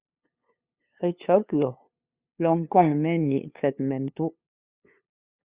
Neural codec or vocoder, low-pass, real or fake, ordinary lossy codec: codec, 16 kHz, 2 kbps, FunCodec, trained on LibriTTS, 25 frames a second; 3.6 kHz; fake; Opus, 64 kbps